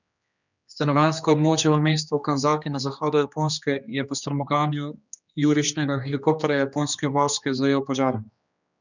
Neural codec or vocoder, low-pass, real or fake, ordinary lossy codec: codec, 16 kHz, 2 kbps, X-Codec, HuBERT features, trained on general audio; 7.2 kHz; fake; none